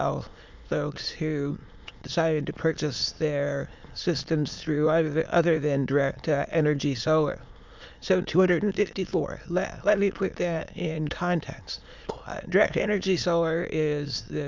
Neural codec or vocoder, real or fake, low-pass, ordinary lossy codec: autoencoder, 22.05 kHz, a latent of 192 numbers a frame, VITS, trained on many speakers; fake; 7.2 kHz; MP3, 64 kbps